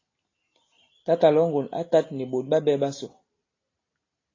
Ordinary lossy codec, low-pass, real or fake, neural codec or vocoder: AAC, 32 kbps; 7.2 kHz; real; none